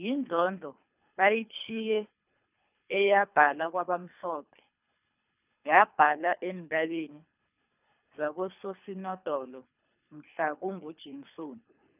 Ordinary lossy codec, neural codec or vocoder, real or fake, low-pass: none; codec, 24 kHz, 3 kbps, HILCodec; fake; 3.6 kHz